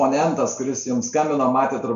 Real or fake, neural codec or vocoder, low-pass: real; none; 7.2 kHz